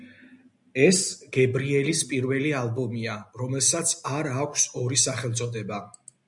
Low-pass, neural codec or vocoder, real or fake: 10.8 kHz; none; real